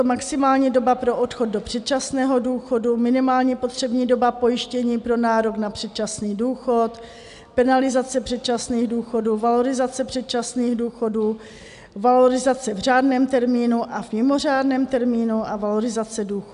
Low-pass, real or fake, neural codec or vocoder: 10.8 kHz; real; none